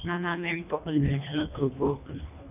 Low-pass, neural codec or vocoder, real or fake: 3.6 kHz; codec, 24 kHz, 1.5 kbps, HILCodec; fake